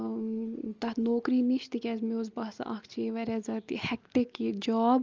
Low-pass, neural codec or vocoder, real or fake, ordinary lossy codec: 7.2 kHz; none; real; Opus, 24 kbps